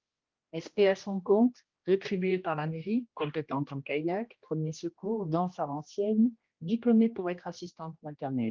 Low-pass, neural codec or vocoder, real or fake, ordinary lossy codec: 7.2 kHz; codec, 16 kHz, 1 kbps, X-Codec, HuBERT features, trained on general audio; fake; Opus, 32 kbps